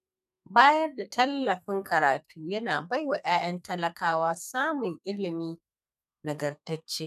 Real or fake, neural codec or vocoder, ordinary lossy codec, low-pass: fake; codec, 32 kHz, 1.9 kbps, SNAC; none; 14.4 kHz